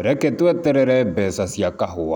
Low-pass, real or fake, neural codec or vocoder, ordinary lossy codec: 19.8 kHz; real; none; none